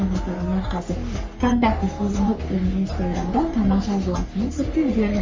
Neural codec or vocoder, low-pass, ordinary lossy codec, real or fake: codec, 44.1 kHz, 3.4 kbps, Pupu-Codec; 7.2 kHz; Opus, 32 kbps; fake